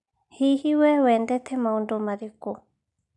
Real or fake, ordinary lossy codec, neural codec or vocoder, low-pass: real; none; none; 10.8 kHz